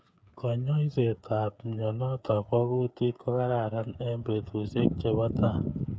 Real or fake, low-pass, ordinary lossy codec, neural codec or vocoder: fake; none; none; codec, 16 kHz, 8 kbps, FreqCodec, smaller model